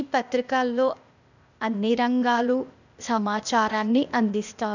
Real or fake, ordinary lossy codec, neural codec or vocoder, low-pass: fake; none; codec, 16 kHz, 0.8 kbps, ZipCodec; 7.2 kHz